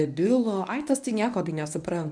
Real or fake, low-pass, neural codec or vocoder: fake; 9.9 kHz; codec, 24 kHz, 0.9 kbps, WavTokenizer, medium speech release version 1